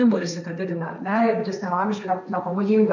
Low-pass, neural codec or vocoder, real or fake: 7.2 kHz; codec, 16 kHz, 1.1 kbps, Voila-Tokenizer; fake